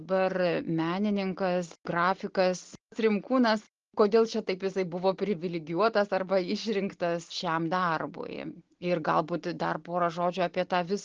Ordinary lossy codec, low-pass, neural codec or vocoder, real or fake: Opus, 16 kbps; 7.2 kHz; none; real